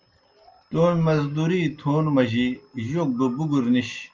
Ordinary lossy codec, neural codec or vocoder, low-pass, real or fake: Opus, 24 kbps; none; 7.2 kHz; real